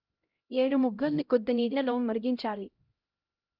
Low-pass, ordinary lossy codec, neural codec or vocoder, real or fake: 5.4 kHz; Opus, 24 kbps; codec, 16 kHz, 0.5 kbps, X-Codec, HuBERT features, trained on LibriSpeech; fake